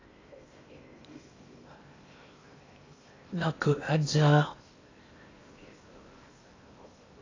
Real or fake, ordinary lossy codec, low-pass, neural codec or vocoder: fake; AAC, 32 kbps; 7.2 kHz; codec, 16 kHz in and 24 kHz out, 0.8 kbps, FocalCodec, streaming, 65536 codes